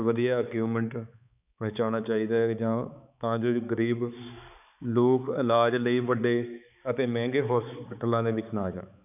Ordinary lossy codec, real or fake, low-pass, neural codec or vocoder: none; fake; 3.6 kHz; codec, 16 kHz, 4 kbps, X-Codec, HuBERT features, trained on balanced general audio